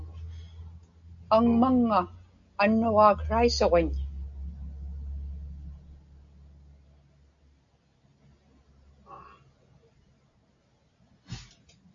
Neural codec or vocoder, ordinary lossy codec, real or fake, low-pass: none; AAC, 64 kbps; real; 7.2 kHz